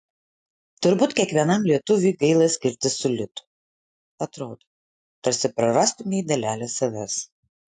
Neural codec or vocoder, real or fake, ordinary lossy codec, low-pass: none; real; AAC, 64 kbps; 9.9 kHz